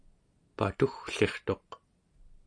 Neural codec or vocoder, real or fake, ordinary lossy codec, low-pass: none; real; AAC, 48 kbps; 9.9 kHz